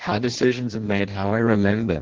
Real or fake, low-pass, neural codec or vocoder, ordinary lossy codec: fake; 7.2 kHz; codec, 16 kHz in and 24 kHz out, 0.6 kbps, FireRedTTS-2 codec; Opus, 16 kbps